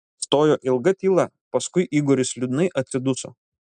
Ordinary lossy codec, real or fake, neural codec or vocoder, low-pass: MP3, 96 kbps; real; none; 9.9 kHz